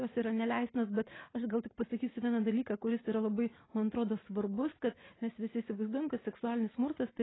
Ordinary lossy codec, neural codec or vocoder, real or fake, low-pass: AAC, 16 kbps; none; real; 7.2 kHz